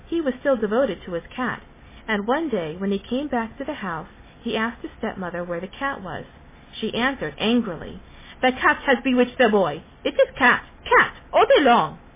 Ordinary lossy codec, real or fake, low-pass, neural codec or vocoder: MP3, 16 kbps; real; 3.6 kHz; none